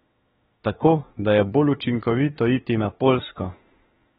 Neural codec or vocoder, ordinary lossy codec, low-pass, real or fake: autoencoder, 48 kHz, 32 numbers a frame, DAC-VAE, trained on Japanese speech; AAC, 16 kbps; 19.8 kHz; fake